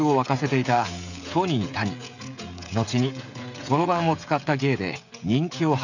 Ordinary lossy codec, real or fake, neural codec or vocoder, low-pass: none; fake; codec, 16 kHz, 16 kbps, FreqCodec, smaller model; 7.2 kHz